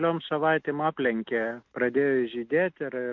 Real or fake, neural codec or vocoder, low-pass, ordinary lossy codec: real; none; 7.2 kHz; MP3, 48 kbps